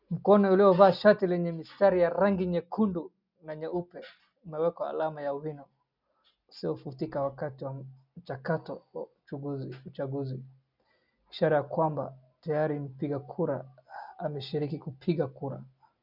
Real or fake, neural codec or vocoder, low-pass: real; none; 5.4 kHz